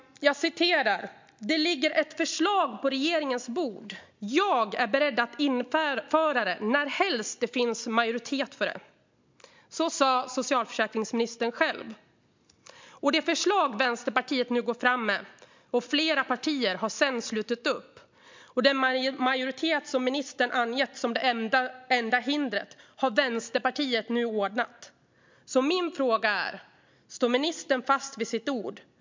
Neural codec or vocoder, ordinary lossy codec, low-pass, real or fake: none; MP3, 64 kbps; 7.2 kHz; real